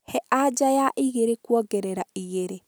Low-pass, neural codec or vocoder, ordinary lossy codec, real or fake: none; none; none; real